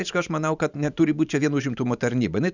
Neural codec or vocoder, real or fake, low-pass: vocoder, 44.1 kHz, 128 mel bands every 512 samples, BigVGAN v2; fake; 7.2 kHz